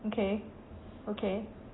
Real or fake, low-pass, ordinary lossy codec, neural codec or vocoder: real; 7.2 kHz; AAC, 16 kbps; none